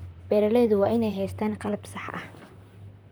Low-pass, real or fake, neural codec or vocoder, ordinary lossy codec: none; fake; vocoder, 44.1 kHz, 128 mel bands, Pupu-Vocoder; none